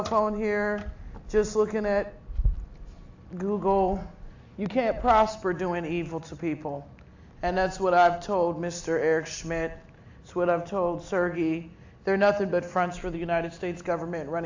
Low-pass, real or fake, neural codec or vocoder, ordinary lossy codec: 7.2 kHz; real; none; AAC, 48 kbps